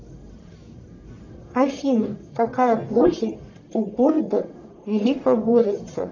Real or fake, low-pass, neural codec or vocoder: fake; 7.2 kHz; codec, 44.1 kHz, 1.7 kbps, Pupu-Codec